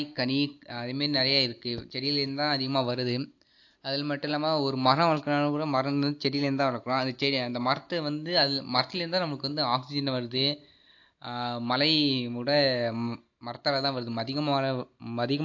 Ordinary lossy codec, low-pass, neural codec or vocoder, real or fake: AAC, 48 kbps; 7.2 kHz; none; real